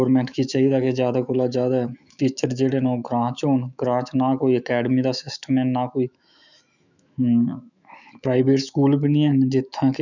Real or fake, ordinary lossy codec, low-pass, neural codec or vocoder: fake; none; 7.2 kHz; autoencoder, 48 kHz, 128 numbers a frame, DAC-VAE, trained on Japanese speech